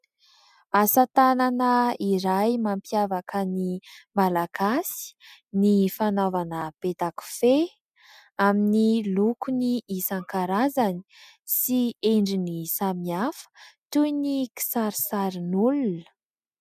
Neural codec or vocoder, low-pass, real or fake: none; 14.4 kHz; real